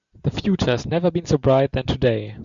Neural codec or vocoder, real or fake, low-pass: none; real; 7.2 kHz